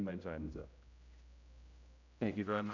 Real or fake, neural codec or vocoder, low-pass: fake; codec, 16 kHz, 0.5 kbps, X-Codec, HuBERT features, trained on general audio; 7.2 kHz